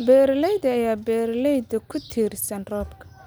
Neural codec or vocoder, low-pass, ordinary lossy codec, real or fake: none; none; none; real